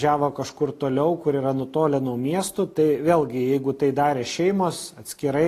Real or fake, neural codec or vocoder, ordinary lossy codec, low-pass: real; none; AAC, 48 kbps; 14.4 kHz